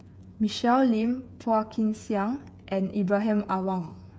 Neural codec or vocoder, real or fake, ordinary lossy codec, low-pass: codec, 16 kHz, 8 kbps, FreqCodec, smaller model; fake; none; none